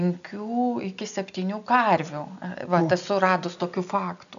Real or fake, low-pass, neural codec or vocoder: real; 7.2 kHz; none